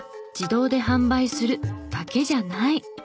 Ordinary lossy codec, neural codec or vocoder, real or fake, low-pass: none; none; real; none